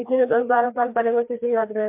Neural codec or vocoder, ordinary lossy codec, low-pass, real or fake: codec, 16 kHz, 2 kbps, FreqCodec, larger model; MP3, 32 kbps; 3.6 kHz; fake